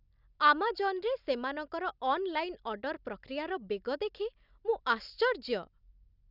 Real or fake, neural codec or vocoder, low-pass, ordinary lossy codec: real; none; 5.4 kHz; none